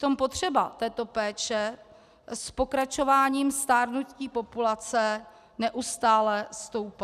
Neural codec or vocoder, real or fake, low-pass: none; real; 14.4 kHz